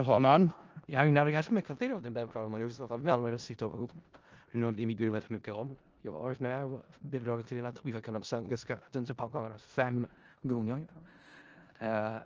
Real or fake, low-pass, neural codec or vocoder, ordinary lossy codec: fake; 7.2 kHz; codec, 16 kHz in and 24 kHz out, 0.4 kbps, LongCat-Audio-Codec, four codebook decoder; Opus, 24 kbps